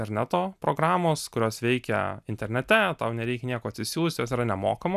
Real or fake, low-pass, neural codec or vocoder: real; 14.4 kHz; none